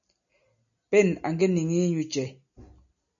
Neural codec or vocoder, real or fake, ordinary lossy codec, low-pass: none; real; MP3, 96 kbps; 7.2 kHz